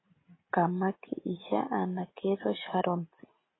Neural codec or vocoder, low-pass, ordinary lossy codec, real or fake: none; 7.2 kHz; AAC, 16 kbps; real